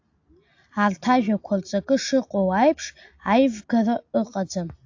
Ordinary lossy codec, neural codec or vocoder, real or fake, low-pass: AAC, 48 kbps; none; real; 7.2 kHz